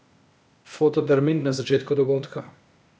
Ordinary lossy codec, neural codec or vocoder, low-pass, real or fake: none; codec, 16 kHz, 0.8 kbps, ZipCodec; none; fake